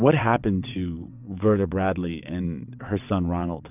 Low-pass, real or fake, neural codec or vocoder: 3.6 kHz; fake; vocoder, 22.05 kHz, 80 mel bands, WaveNeXt